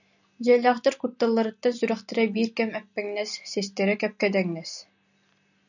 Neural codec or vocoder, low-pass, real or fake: none; 7.2 kHz; real